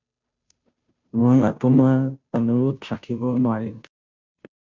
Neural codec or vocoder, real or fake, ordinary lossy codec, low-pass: codec, 16 kHz, 0.5 kbps, FunCodec, trained on Chinese and English, 25 frames a second; fake; MP3, 64 kbps; 7.2 kHz